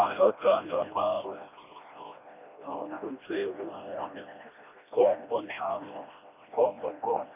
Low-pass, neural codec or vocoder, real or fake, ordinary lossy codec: 3.6 kHz; codec, 16 kHz, 1 kbps, FreqCodec, smaller model; fake; none